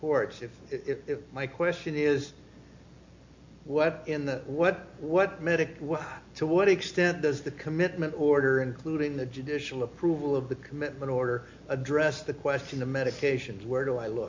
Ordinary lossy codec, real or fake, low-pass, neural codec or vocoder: MP3, 48 kbps; real; 7.2 kHz; none